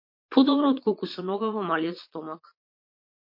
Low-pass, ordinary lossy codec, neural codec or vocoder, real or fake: 5.4 kHz; MP3, 32 kbps; vocoder, 22.05 kHz, 80 mel bands, Vocos; fake